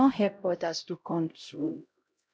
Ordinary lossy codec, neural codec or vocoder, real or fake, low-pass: none; codec, 16 kHz, 0.5 kbps, X-Codec, HuBERT features, trained on LibriSpeech; fake; none